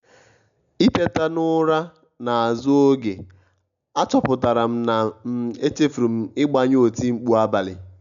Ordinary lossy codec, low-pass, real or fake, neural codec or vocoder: none; 7.2 kHz; real; none